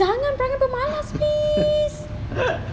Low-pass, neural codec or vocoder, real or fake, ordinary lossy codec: none; none; real; none